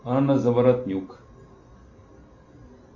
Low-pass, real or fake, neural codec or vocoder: 7.2 kHz; real; none